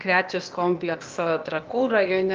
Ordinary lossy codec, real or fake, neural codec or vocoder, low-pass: Opus, 24 kbps; fake; codec, 16 kHz, 0.8 kbps, ZipCodec; 7.2 kHz